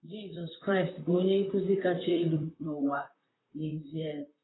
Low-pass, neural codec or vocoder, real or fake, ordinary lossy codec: 7.2 kHz; vocoder, 44.1 kHz, 128 mel bands, Pupu-Vocoder; fake; AAC, 16 kbps